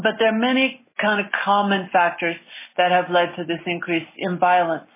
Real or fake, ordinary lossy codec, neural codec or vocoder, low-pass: real; MP3, 16 kbps; none; 3.6 kHz